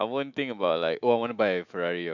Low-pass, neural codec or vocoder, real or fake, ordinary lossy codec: 7.2 kHz; autoencoder, 48 kHz, 128 numbers a frame, DAC-VAE, trained on Japanese speech; fake; none